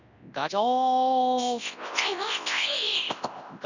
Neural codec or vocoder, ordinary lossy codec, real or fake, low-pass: codec, 24 kHz, 0.9 kbps, WavTokenizer, large speech release; none; fake; 7.2 kHz